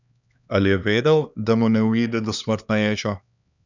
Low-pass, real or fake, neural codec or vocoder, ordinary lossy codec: 7.2 kHz; fake; codec, 16 kHz, 2 kbps, X-Codec, HuBERT features, trained on LibriSpeech; none